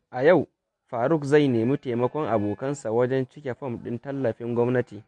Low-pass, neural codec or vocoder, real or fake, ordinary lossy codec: 10.8 kHz; none; real; MP3, 48 kbps